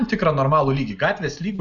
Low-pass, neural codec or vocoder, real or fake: 7.2 kHz; none; real